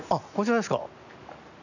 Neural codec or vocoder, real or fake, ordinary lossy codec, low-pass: none; real; none; 7.2 kHz